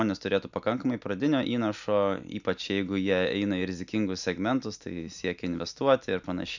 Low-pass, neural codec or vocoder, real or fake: 7.2 kHz; none; real